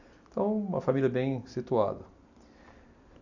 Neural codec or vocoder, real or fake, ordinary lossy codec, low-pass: none; real; none; 7.2 kHz